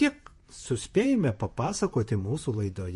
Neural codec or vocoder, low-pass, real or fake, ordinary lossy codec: vocoder, 44.1 kHz, 128 mel bands, Pupu-Vocoder; 14.4 kHz; fake; MP3, 48 kbps